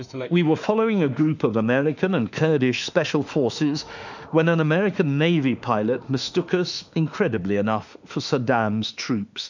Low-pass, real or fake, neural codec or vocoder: 7.2 kHz; fake; autoencoder, 48 kHz, 32 numbers a frame, DAC-VAE, trained on Japanese speech